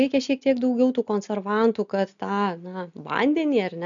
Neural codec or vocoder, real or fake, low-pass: none; real; 7.2 kHz